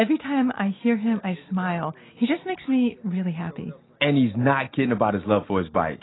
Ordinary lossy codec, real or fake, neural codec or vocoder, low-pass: AAC, 16 kbps; real; none; 7.2 kHz